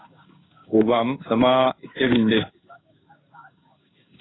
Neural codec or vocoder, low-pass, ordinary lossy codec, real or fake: codec, 16 kHz in and 24 kHz out, 1 kbps, XY-Tokenizer; 7.2 kHz; AAC, 16 kbps; fake